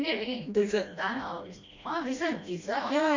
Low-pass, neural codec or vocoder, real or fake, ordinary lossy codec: 7.2 kHz; codec, 16 kHz, 1 kbps, FreqCodec, smaller model; fake; MP3, 48 kbps